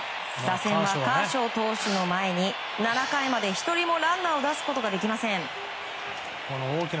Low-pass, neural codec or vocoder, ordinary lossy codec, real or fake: none; none; none; real